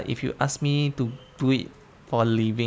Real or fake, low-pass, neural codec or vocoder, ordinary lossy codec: real; none; none; none